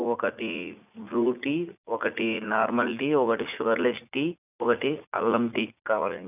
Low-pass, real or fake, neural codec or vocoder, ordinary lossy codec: 3.6 kHz; fake; vocoder, 44.1 kHz, 80 mel bands, Vocos; none